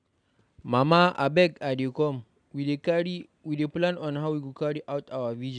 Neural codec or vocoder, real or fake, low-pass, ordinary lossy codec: none; real; 9.9 kHz; none